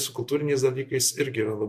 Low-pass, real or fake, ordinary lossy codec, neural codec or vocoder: 14.4 kHz; real; MP3, 64 kbps; none